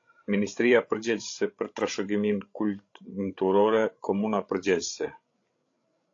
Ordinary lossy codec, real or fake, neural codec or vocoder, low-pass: AAC, 48 kbps; fake; codec, 16 kHz, 16 kbps, FreqCodec, larger model; 7.2 kHz